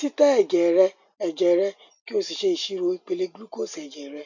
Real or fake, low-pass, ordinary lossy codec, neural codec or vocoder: real; 7.2 kHz; none; none